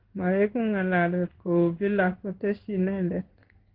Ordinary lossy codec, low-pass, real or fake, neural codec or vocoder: Opus, 16 kbps; 5.4 kHz; fake; codec, 16 kHz in and 24 kHz out, 1 kbps, XY-Tokenizer